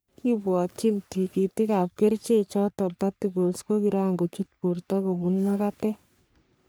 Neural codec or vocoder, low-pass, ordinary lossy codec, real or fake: codec, 44.1 kHz, 3.4 kbps, Pupu-Codec; none; none; fake